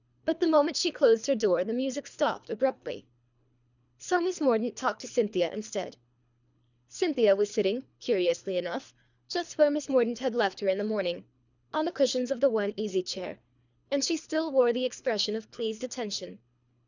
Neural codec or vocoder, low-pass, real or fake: codec, 24 kHz, 3 kbps, HILCodec; 7.2 kHz; fake